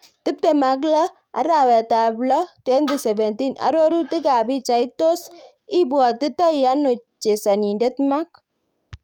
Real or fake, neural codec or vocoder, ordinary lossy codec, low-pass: fake; codec, 44.1 kHz, 7.8 kbps, DAC; none; 19.8 kHz